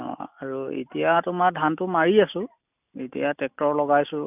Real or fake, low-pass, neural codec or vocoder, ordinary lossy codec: real; 3.6 kHz; none; none